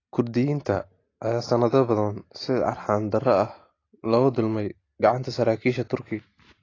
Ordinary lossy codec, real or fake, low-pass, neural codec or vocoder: AAC, 32 kbps; real; 7.2 kHz; none